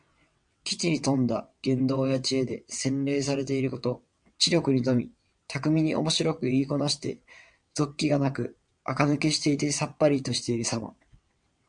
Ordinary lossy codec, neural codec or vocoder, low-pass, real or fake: MP3, 64 kbps; vocoder, 22.05 kHz, 80 mel bands, WaveNeXt; 9.9 kHz; fake